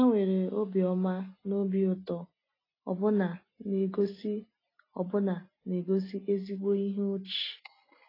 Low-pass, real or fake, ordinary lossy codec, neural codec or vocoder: 5.4 kHz; real; AAC, 24 kbps; none